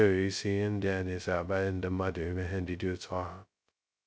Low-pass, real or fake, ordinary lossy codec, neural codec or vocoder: none; fake; none; codec, 16 kHz, 0.2 kbps, FocalCodec